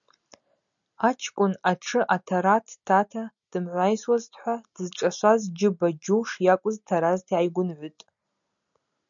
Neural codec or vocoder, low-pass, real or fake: none; 7.2 kHz; real